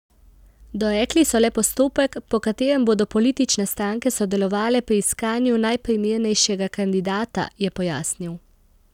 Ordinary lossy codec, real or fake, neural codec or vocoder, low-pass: none; real; none; 19.8 kHz